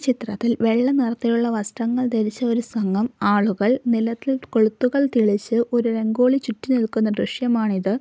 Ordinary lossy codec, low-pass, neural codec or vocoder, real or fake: none; none; none; real